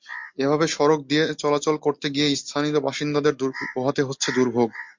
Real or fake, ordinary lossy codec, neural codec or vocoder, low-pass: real; MP3, 64 kbps; none; 7.2 kHz